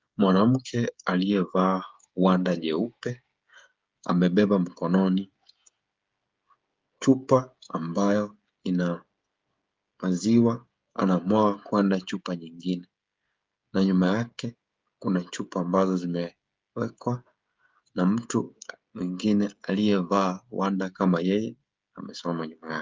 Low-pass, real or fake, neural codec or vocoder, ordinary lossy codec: 7.2 kHz; fake; codec, 16 kHz, 16 kbps, FreqCodec, smaller model; Opus, 24 kbps